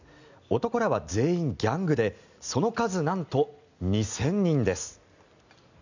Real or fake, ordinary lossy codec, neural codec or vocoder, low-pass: real; none; none; 7.2 kHz